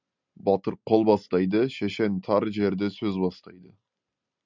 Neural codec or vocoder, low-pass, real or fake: none; 7.2 kHz; real